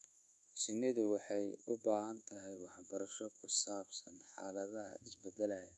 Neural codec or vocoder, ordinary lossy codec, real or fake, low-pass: codec, 24 kHz, 1.2 kbps, DualCodec; none; fake; none